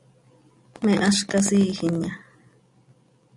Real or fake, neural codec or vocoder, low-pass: real; none; 10.8 kHz